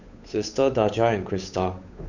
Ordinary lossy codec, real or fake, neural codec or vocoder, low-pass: none; fake; codec, 16 kHz, 8 kbps, FunCodec, trained on Chinese and English, 25 frames a second; 7.2 kHz